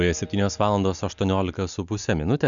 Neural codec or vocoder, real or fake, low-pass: none; real; 7.2 kHz